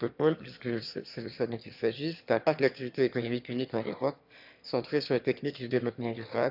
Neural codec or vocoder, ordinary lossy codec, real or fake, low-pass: autoencoder, 22.05 kHz, a latent of 192 numbers a frame, VITS, trained on one speaker; AAC, 48 kbps; fake; 5.4 kHz